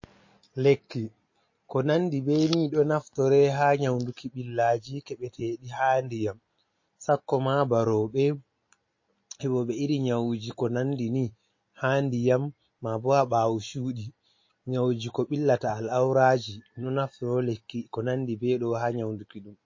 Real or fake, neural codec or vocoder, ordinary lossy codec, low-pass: real; none; MP3, 32 kbps; 7.2 kHz